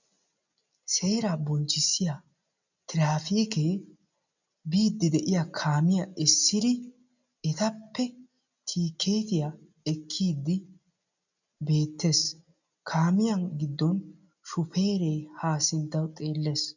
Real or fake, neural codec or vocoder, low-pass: real; none; 7.2 kHz